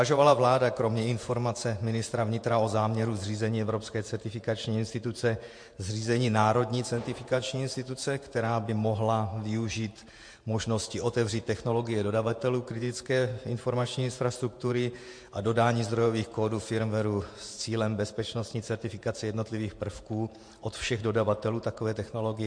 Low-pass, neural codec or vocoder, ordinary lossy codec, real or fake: 9.9 kHz; vocoder, 48 kHz, 128 mel bands, Vocos; MP3, 48 kbps; fake